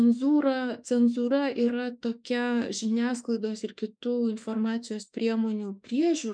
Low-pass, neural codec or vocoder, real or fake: 9.9 kHz; autoencoder, 48 kHz, 32 numbers a frame, DAC-VAE, trained on Japanese speech; fake